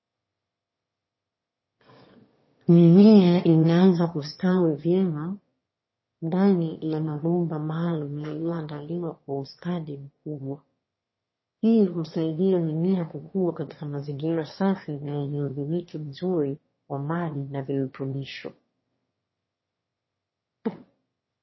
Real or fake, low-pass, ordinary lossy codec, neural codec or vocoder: fake; 7.2 kHz; MP3, 24 kbps; autoencoder, 22.05 kHz, a latent of 192 numbers a frame, VITS, trained on one speaker